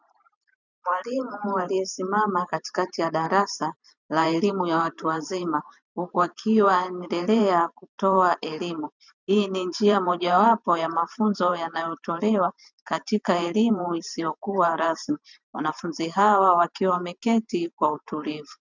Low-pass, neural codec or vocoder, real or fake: 7.2 kHz; vocoder, 44.1 kHz, 128 mel bands every 512 samples, BigVGAN v2; fake